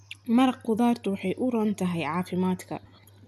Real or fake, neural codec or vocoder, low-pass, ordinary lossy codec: real; none; 14.4 kHz; none